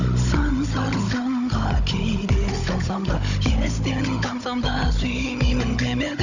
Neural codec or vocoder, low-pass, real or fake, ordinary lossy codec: codec, 16 kHz, 16 kbps, FunCodec, trained on Chinese and English, 50 frames a second; 7.2 kHz; fake; none